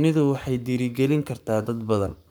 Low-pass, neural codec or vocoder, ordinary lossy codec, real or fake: none; codec, 44.1 kHz, 7.8 kbps, Pupu-Codec; none; fake